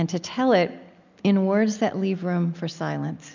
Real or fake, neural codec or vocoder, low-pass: real; none; 7.2 kHz